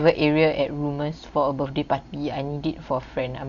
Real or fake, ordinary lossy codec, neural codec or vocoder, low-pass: real; Opus, 64 kbps; none; 7.2 kHz